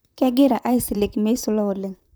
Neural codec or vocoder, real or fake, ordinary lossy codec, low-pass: vocoder, 44.1 kHz, 128 mel bands, Pupu-Vocoder; fake; none; none